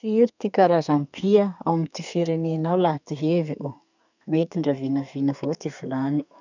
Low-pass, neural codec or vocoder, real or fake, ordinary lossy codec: 7.2 kHz; codec, 32 kHz, 1.9 kbps, SNAC; fake; none